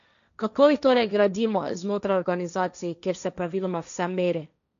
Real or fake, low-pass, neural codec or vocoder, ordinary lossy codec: fake; 7.2 kHz; codec, 16 kHz, 1.1 kbps, Voila-Tokenizer; none